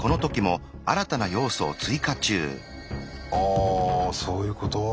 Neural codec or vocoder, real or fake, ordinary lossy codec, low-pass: none; real; none; none